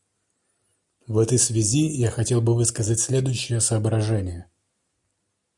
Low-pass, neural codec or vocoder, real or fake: 10.8 kHz; none; real